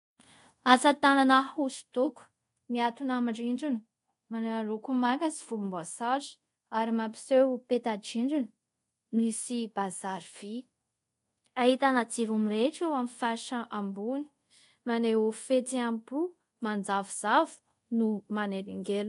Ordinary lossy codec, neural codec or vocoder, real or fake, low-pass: AAC, 48 kbps; codec, 24 kHz, 0.5 kbps, DualCodec; fake; 10.8 kHz